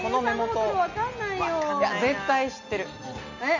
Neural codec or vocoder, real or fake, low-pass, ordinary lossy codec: none; real; 7.2 kHz; none